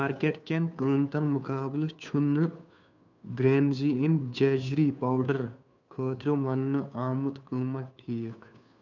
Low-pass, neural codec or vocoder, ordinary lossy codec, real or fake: 7.2 kHz; codec, 16 kHz, 2 kbps, FunCodec, trained on Chinese and English, 25 frames a second; none; fake